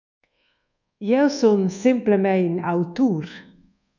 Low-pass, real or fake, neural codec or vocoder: 7.2 kHz; fake; codec, 24 kHz, 1.2 kbps, DualCodec